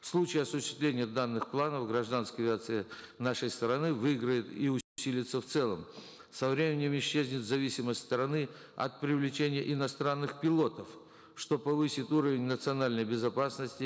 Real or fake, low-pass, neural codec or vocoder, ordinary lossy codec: real; none; none; none